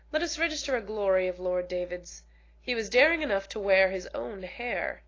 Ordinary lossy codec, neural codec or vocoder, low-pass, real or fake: AAC, 32 kbps; none; 7.2 kHz; real